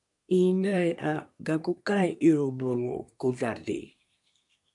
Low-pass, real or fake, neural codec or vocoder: 10.8 kHz; fake; codec, 24 kHz, 1 kbps, SNAC